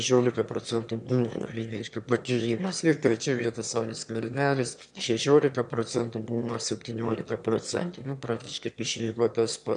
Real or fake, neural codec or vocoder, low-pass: fake; autoencoder, 22.05 kHz, a latent of 192 numbers a frame, VITS, trained on one speaker; 9.9 kHz